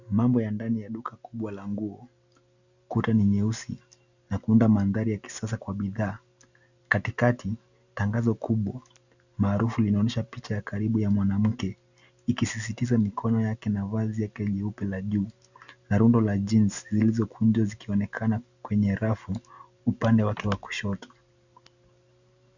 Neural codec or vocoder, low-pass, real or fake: none; 7.2 kHz; real